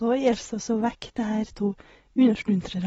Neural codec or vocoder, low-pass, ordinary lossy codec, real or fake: vocoder, 24 kHz, 100 mel bands, Vocos; 10.8 kHz; AAC, 24 kbps; fake